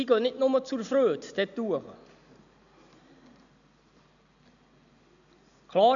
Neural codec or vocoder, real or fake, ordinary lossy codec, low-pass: none; real; none; 7.2 kHz